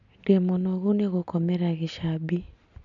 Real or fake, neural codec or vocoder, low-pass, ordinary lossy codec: real; none; 7.2 kHz; none